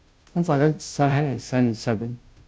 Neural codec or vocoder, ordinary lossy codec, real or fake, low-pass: codec, 16 kHz, 0.5 kbps, FunCodec, trained on Chinese and English, 25 frames a second; none; fake; none